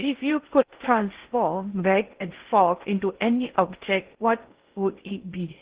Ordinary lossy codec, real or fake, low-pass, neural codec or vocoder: Opus, 16 kbps; fake; 3.6 kHz; codec, 16 kHz in and 24 kHz out, 0.6 kbps, FocalCodec, streaming, 2048 codes